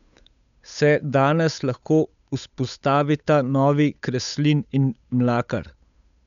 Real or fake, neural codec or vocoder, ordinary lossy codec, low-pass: fake; codec, 16 kHz, 8 kbps, FunCodec, trained on Chinese and English, 25 frames a second; none; 7.2 kHz